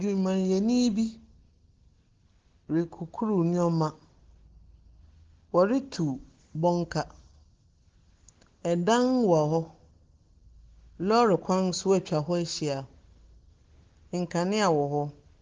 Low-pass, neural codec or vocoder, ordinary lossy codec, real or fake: 7.2 kHz; none; Opus, 16 kbps; real